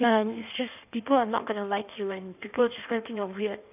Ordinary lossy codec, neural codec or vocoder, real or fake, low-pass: none; codec, 16 kHz in and 24 kHz out, 1.1 kbps, FireRedTTS-2 codec; fake; 3.6 kHz